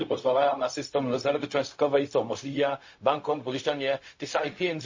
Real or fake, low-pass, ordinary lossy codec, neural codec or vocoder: fake; 7.2 kHz; MP3, 32 kbps; codec, 16 kHz, 0.4 kbps, LongCat-Audio-Codec